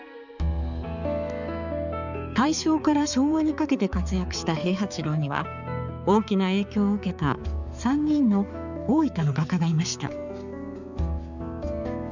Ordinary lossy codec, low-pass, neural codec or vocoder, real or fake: none; 7.2 kHz; codec, 16 kHz, 4 kbps, X-Codec, HuBERT features, trained on balanced general audio; fake